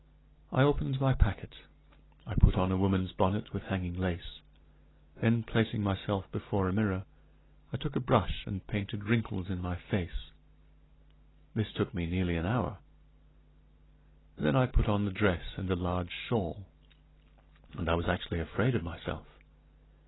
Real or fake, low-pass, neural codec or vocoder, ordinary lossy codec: real; 7.2 kHz; none; AAC, 16 kbps